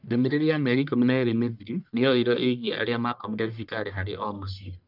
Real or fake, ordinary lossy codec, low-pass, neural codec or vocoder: fake; none; 5.4 kHz; codec, 44.1 kHz, 1.7 kbps, Pupu-Codec